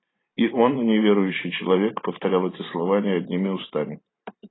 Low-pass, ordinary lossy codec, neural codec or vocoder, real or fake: 7.2 kHz; AAC, 16 kbps; vocoder, 44.1 kHz, 128 mel bands every 512 samples, BigVGAN v2; fake